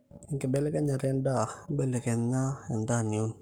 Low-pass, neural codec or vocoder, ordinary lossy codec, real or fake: none; codec, 44.1 kHz, 7.8 kbps, Pupu-Codec; none; fake